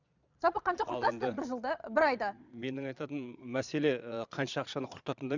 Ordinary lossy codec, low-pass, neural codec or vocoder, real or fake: none; 7.2 kHz; vocoder, 22.05 kHz, 80 mel bands, Vocos; fake